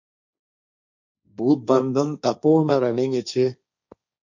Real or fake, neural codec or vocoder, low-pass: fake; codec, 16 kHz, 1.1 kbps, Voila-Tokenizer; 7.2 kHz